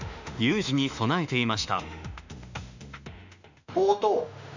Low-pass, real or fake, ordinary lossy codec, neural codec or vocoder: 7.2 kHz; fake; none; autoencoder, 48 kHz, 32 numbers a frame, DAC-VAE, trained on Japanese speech